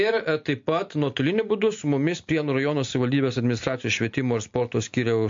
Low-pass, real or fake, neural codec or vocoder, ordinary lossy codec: 7.2 kHz; real; none; MP3, 48 kbps